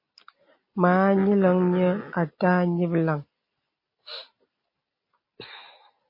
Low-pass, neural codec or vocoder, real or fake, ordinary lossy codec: 5.4 kHz; none; real; MP3, 24 kbps